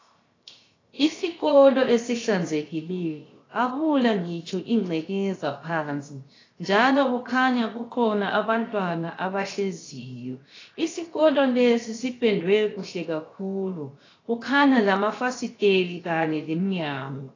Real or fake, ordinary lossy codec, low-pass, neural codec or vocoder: fake; AAC, 32 kbps; 7.2 kHz; codec, 16 kHz, 0.7 kbps, FocalCodec